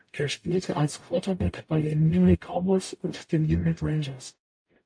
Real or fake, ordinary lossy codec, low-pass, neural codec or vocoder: fake; AAC, 64 kbps; 9.9 kHz; codec, 44.1 kHz, 0.9 kbps, DAC